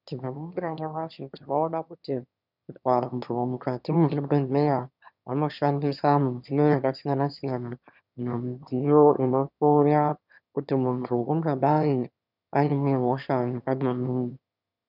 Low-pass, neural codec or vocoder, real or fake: 5.4 kHz; autoencoder, 22.05 kHz, a latent of 192 numbers a frame, VITS, trained on one speaker; fake